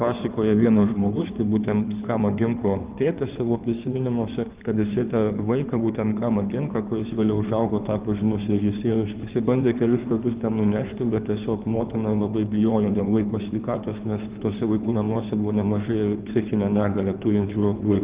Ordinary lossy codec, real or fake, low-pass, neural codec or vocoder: Opus, 32 kbps; fake; 3.6 kHz; codec, 16 kHz in and 24 kHz out, 2.2 kbps, FireRedTTS-2 codec